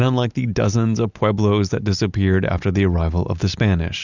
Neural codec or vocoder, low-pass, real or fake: none; 7.2 kHz; real